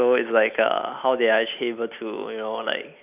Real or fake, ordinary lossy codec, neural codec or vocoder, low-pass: real; none; none; 3.6 kHz